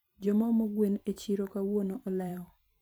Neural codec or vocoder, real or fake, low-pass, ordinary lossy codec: vocoder, 44.1 kHz, 128 mel bands every 512 samples, BigVGAN v2; fake; none; none